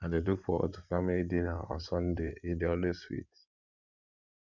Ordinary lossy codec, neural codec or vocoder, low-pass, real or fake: none; codec, 16 kHz in and 24 kHz out, 2.2 kbps, FireRedTTS-2 codec; 7.2 kHz; fake